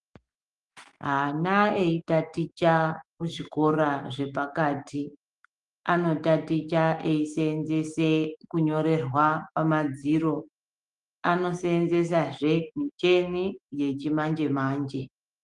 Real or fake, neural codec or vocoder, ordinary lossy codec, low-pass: fake; autoencoder, 48 kHz, 128 numbers a frame, DAC-VAE, trained on Japanese speech; Opus, 24 kbps; 10.8 kHz